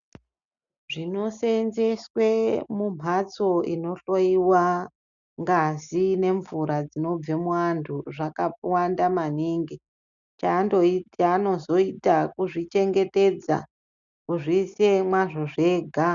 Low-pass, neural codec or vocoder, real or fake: 7.2 kHz; none; real